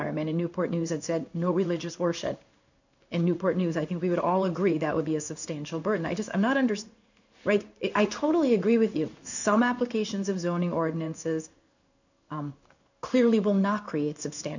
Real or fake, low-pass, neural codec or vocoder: fake; 7.2 kHz; codec, 16 kHz in and 24 kHz out, 1 kbps, XY-Tokenizer